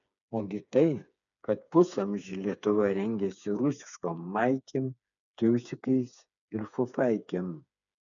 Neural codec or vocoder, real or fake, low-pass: codec, 16 kHz, 4 kbps, FreqCodec, smaller model; fake; 7.2 kHz